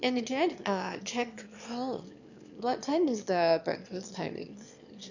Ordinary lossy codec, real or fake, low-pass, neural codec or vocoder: none; fake; 7.2 kHz; autoencoder, 22.05 kHz, a latent of 192 numbers a frame, VITS, trained on one speaker